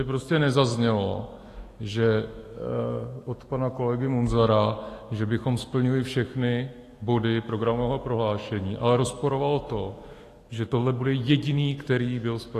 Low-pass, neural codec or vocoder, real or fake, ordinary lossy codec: 14.4 kHz; autoencoder, 48 kHz, 128 numbers a frame, DAC-VAE, trained on Japanese speech; fake; AAC, 48 kbps